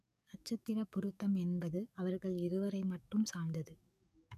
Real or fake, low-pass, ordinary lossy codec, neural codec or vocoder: fake; 14.4 kHz; none; codec, 44.1 kHz, 7.8 kbps, DAC